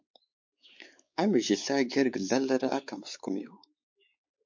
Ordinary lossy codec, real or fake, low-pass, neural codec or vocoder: MP3, 48 kbps; fake; 7.2 kHz; codec, 16 kHz, 4 kbps, X-Codec, WavLM features, trained on Multilingual LibriSpeech